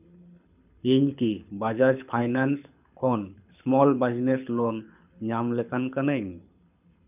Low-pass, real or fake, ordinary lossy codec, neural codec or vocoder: 3.6 kHz; fake; none; codec, 24 kHz, 6 kbps, HILCodec